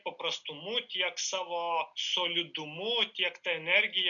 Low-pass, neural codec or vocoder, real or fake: 7.2 kHz; none; real